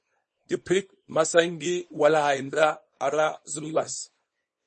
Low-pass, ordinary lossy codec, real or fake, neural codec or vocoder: 10.8 kHz; MP3, 32 kbps; fake; codec, 24 kHz, 0.9 kbps, WavTokenizer, small release